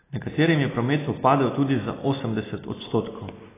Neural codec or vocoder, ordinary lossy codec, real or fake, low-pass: none; AAC, 16 kbps; real; 3.6 kHz